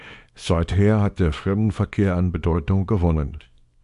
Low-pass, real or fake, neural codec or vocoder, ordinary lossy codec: 10.8 kHz; fake; codec, 24 kHz, 0.9 kbps, WavTokenizer, small release; MP3, 64 kbps